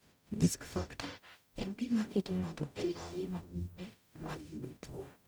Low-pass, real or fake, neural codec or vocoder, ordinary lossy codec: none; fake; codec, 44.1 kHz, 0.9 kbps, DAC; none